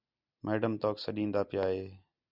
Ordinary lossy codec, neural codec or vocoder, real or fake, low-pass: Opus, 64 kbps; none; real; 5.4 kHz